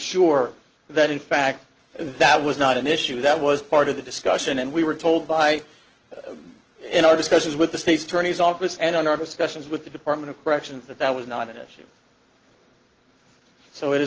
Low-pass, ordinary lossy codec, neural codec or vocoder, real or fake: 7.2 kHz; Opus, 16 kbps; none; real